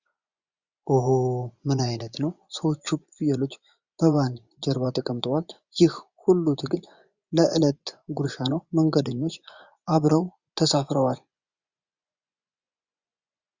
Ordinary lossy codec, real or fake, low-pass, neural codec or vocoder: Opus, 64 kbps; fake; 7.2 kHz; vocoder, 24 kHz, 100 mel bands, Vocos